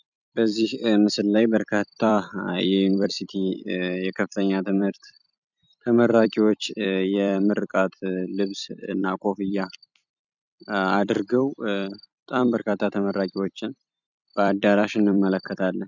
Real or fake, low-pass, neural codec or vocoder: fake; 7.2 kHz; vocoder, 44.1 kHz, 128 mel bands every 256 samples, BigVGAN v2